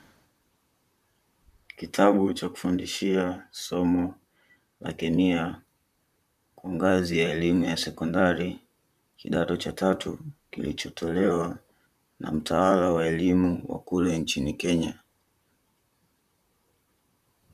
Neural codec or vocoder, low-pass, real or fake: vocoder, 44.1 kHz, 128 mel bands, Pupu-Vocoder; 14.4 kHz; fake